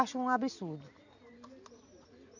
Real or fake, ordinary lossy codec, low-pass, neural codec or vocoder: real; none; 7.2 kHz; none